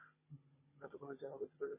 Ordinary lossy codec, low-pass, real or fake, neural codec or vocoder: MP3, 16 kbps; 3.6 kHz; fake; codec, 16 kHz, 16 kbps, FunCodec, trained on Chinese and English, 50 frames a second